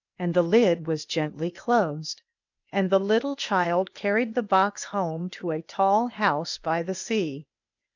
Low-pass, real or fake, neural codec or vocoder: 7.2 kHz; fake; codec, 16 kHz, 0.8 kbps, ZipCodec